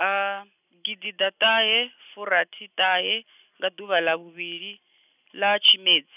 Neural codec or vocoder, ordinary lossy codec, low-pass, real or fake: none; none; 3.6 kHz; real